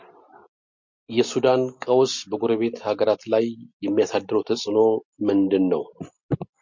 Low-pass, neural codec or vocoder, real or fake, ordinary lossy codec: 7.2 kHz; none; real; MP3, 48 kbps